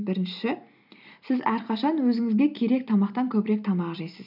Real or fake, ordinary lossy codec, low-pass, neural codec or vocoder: real; none; 5.4 kHz; none